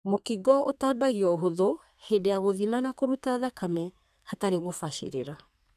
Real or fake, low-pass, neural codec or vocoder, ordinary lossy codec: fake; 14.4 kHz; codec, 32 kHz, 1.9 kbps, SNAC; none